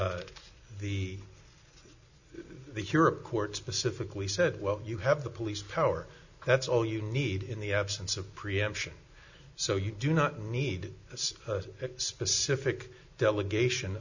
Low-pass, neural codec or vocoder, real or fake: 7.2 kHz; none; real